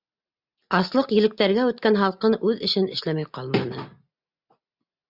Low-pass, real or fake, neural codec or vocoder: 5.4 kHz; real; none